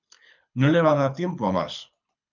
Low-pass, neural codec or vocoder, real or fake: 7.2 kHz; codec, 24 kHz, 6 kbps, HILCodec; fake